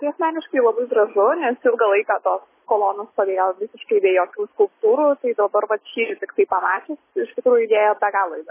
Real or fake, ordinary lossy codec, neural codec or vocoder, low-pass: real; MP3, 16 kbps; none; 3.6 kHz